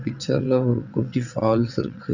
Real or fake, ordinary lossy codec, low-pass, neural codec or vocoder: fake; none; 7.2 kHz; vocoder, 22.05 kHz, 80 mel bands, WaveNeXt